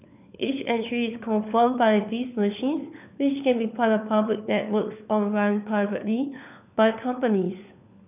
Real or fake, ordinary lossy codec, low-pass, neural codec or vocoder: fake; none; 3.6 kHz; codec, 16 kHz, 4 kbps, FunCodec, trained on LibriTTS, 50 frames a second